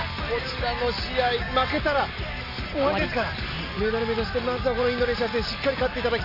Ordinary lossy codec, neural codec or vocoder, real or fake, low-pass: none; none; real; 5.4 kHz